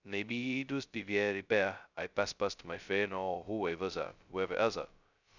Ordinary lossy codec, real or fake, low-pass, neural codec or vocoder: none; fake; 7.2 kHz; codec, 16 kHz, 0.2 kbps, FocalCodec